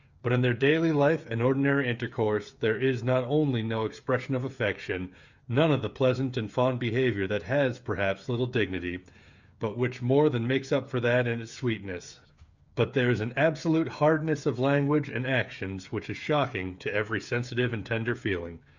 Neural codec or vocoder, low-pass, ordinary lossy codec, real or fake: codec, 16 kHz, 8 kbps, FreqCodec, smaller model; 7.2 kHz; Opus, 64 kbps; fake